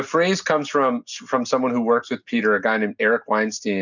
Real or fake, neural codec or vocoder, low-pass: real; none; 7.2 kHz